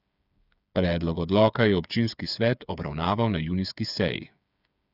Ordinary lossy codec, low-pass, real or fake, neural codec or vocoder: none; 5.4 kHz; fake; codec, 16 kHz, 8 kbps, FreqCodec, smaller model